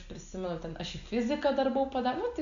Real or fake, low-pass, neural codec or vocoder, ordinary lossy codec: real; 7.2 kHz; none; AAC, 64 kbps